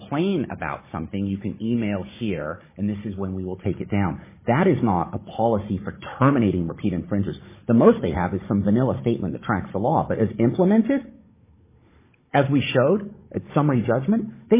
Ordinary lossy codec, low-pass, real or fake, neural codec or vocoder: MP3, 16 kbps; 3.6 kHz; fake; codec, 16 kHz, 16 kbps, FunCodec, trained on Chinese and English, 50 frames a second